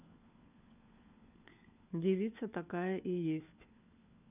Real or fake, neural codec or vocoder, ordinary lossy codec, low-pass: fake; codec, 16 kHz, 4 kbps, FunCodec, trained on LibriTTS, 50 frames a second; none; 3.6 kHz